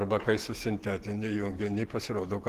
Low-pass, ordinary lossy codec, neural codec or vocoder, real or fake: 14.4 kHz; Opus, 16 kbps; codec, 44.1 kHz, 7.8 kbps, DAC; fake